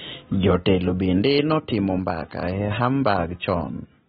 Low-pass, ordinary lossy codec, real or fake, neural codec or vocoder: 9.9 kHz; AAC, 16 kbps; real; none